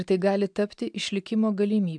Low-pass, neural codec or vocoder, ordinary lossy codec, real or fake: 9.9 kHz; none; MP3, 96 kbps; real